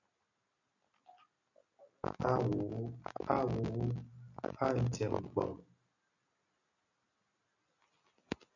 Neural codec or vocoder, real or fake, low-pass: none; real; 7.2 kHz